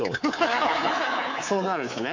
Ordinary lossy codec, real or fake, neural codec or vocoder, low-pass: AAC, 48 kbps; fake; codec, 24 kHz, 3.1 kbps, DualCodec; 7.2 kHz